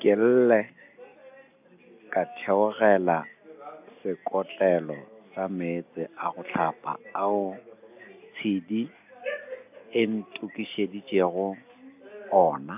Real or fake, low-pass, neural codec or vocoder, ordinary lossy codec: real; 3.6 kHz; none; AAC, 32 kbps